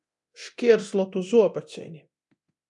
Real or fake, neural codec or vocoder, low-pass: fake; codec, 24 kHz, 0.9 kbps, DualCodec; 10.8 kHz